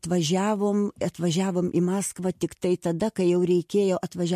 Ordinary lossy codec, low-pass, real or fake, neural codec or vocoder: MP3, 64 kbps; 14.4 kHz; real; none